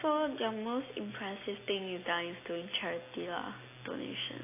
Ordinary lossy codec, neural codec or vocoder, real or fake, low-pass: AAC, 24 kbps; none; real; 3.6 kHz